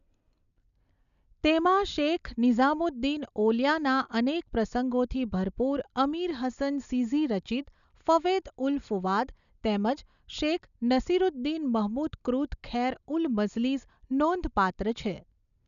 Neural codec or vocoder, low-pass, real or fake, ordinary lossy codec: none; 7.2 kHz; real; none